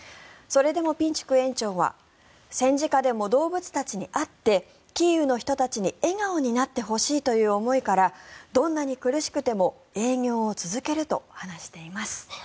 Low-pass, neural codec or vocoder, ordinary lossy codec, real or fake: none; none; none; real